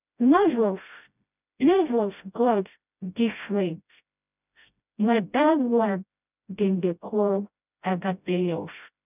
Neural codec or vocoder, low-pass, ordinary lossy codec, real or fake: codec, 16 kHz, 0.5 kbps, FreqCodec, smaller model; 3.6 kHz; none; fake